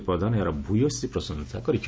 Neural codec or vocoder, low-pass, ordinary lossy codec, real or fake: none; none; none; real